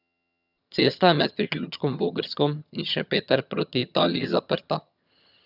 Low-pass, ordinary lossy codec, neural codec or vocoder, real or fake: 5.4 kHz; none; vocoder, 22.05 kHz, 80 mel bands, HiFi-GAN; fake